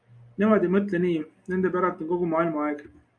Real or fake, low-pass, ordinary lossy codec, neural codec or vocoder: real; 9.9 kHz; MP3, 96 kbps; none